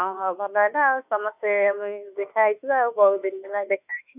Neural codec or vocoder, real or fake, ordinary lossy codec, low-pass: autoencoder, 48 kHz, 32 numbers a frame, DAC-VAE, trained on Japanese speech; fake; none; 3.6 kHz